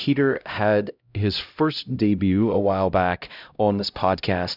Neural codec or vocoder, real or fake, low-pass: codec, 16 kHz, 0.5 kbps, X-Codec, HuBERT features, trained on LibriSpeech; fake; 5.4 kHz